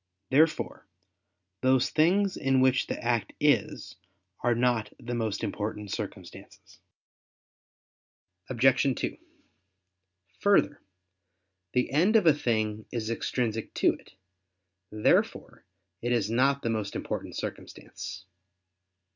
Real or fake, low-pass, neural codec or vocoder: real; 7.2 kHz; none